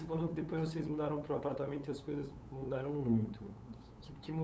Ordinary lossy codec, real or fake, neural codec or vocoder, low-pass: none; fake; codec, 16 kHz, 8 kbps, FunCodec, trained on LibriTTS, 25 frames a second; none